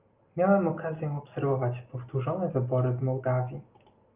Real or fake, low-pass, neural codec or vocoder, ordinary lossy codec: real; 3.6 kHz; none; Opus, 24 kbps